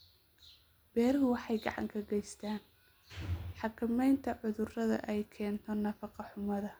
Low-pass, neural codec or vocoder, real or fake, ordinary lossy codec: none; none; real; none